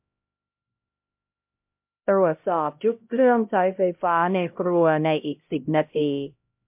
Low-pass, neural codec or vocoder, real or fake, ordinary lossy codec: 3.6 kHz; codec, 16 kHz, 0.5 kbps, X-Codec, HuBERT features, trained on LibriSpeech; fake; MP3, 32 kbps